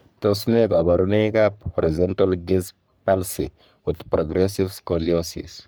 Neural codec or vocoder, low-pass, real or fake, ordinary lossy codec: codec, 44.1 kHz, 3.4 kbps, Pupu-Codec; none; fake; none